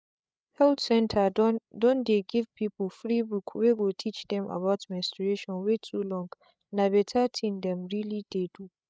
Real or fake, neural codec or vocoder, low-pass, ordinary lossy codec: fake; codec, 16 kHz, 8 kbps, FreqCodec, larger model; none; none